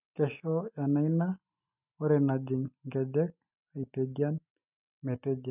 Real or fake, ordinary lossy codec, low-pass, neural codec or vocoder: real; none; 3.6 kHz; none